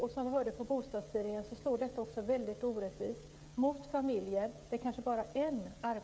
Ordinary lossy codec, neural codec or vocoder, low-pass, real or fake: none; codec, 16 kHz, 16 kbps, FreqCodec, smaller model; none; fake